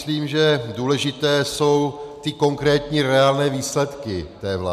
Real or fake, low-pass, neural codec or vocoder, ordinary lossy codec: real; 14.4 kHz; none; MP3, 96 kbps